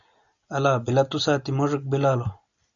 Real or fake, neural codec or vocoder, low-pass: real; none; 7.2 kHz